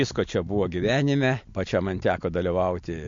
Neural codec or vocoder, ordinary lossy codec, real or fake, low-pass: none; MP3, 48 kbps; real; 7.2 kHz